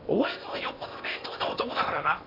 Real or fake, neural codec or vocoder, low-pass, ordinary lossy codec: fake; codec, 16 kHz in and 24 kHz out, 0.8 kbps, FocalCodec, streaming, 65536 codes; 5.4 kHz; MP3, 48 kbps